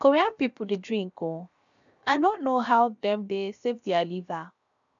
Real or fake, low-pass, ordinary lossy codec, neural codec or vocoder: fake; 7.2 kHz; none; codec, 16 kHz, 0.7 kbps, FocalCodec